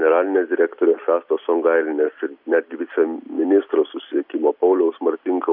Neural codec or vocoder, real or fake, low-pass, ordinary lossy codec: none; real; 5.4 kHz; AAC, 48 kbps